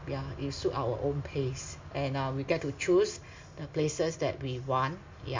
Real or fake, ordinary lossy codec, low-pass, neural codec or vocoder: real; MP3, 64 kbps; 7.2 kHz; none